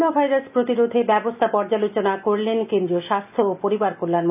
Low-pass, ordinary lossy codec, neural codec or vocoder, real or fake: 3.6 kHz; none; none; real